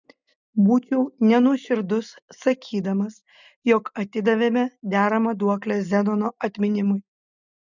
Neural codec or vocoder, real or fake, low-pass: none; real; 7.2 kHz